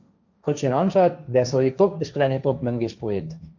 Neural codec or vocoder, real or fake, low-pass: codec, 16 kHz, 1.1 kbps, Voila-Tokenizer; fake; 7.2 kHz